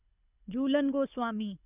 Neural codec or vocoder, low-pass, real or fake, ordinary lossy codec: codec, 24 kHz, 6 kbps, HILCodec; 3.6 kHz; fake; none